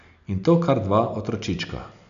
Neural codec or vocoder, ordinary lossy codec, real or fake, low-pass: none; none; real; 7.2 kHz